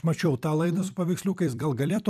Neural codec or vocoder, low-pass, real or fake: vocoder, 44.1 kHz, 128 mel bands every 256 samples, BigVGAN v2; 14.4 kHz; fake